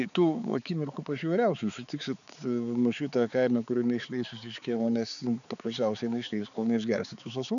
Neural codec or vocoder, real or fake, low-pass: codec, 16 kHz, 4 kbps, X-Codec, HuBERT features, trained on balanced general audio; fake; 7.2 kHz